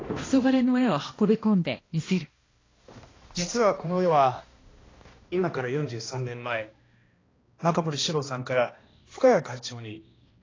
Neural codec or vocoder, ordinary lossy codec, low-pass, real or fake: codec, 16 kHz, 1 kbps, X-Codec, HuBERT features, trained on balanced general audio; AAC, 32 kbps; 7.2 kHz; fake